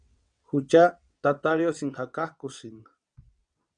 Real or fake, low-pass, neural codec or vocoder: fake; 9.9 kHz; vocoder, 22.05 kHz, 80 mel bands, WaveNeXt